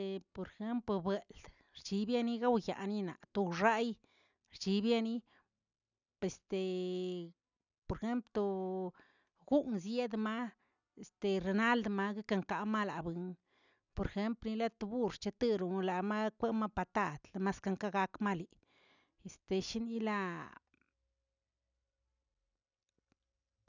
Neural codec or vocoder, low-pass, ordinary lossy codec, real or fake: none; 7.2 kHz; none; real